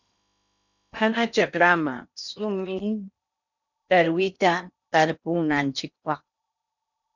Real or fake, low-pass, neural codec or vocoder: fake; 7.2 kHz; codec, 16 kHz in and 24 kHz out, 0.8 kbps, FocalCodec, streaming, 65536 codes